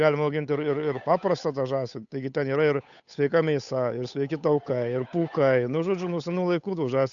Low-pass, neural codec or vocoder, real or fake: 7.2 kHz; codec, 16 kHz, 8 kbps, FunCodec, trained on Chinese and English, 25 frames a second; fake